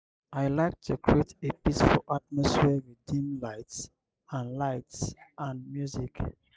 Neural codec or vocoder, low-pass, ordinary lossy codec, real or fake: none; none; none; real